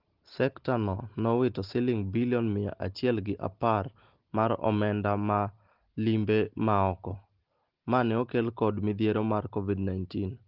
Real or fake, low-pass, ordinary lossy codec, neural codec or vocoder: real; 5.4 kHz; Opus, 16 kbps; none